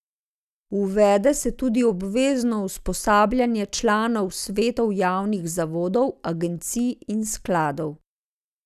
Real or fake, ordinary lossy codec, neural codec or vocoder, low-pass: real; none; none; 14.4 kHz